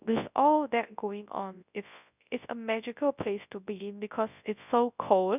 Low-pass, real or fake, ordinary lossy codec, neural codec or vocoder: 3.6 kHz; fake; none; codec, 24 kHz, 0.9 kbps, WavTokenizer, large speech release